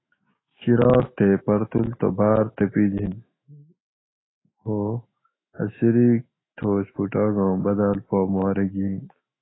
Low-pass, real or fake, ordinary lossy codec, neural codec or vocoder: 7.2 kHz; fake; AAC, 16 kbps; autoencoder, 48 kHz, 128 numbers a frame, DAC-VAE, trained on Japanese speech